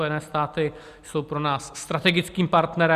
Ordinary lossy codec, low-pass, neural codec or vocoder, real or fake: Opus, 64 kbps; 14.4 kHz; none; real